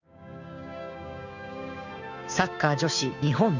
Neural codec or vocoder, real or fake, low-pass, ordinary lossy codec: codec, 16 kHz, 6 kbps, DAC; fake; 7.2 kHz; none